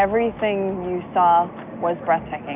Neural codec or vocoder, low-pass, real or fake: none; 3.6 kHz; real